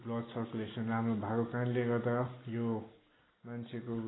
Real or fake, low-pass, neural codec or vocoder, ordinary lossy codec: real; 7.2 kHz; none; AAC, 16 kbps